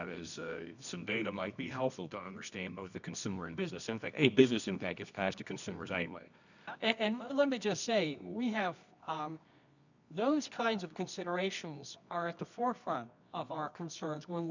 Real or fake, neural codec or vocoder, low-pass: fake; codec, 24 kHz, 0.9 kbps, WavTokenizer, medium music audio release; 7.2 kHz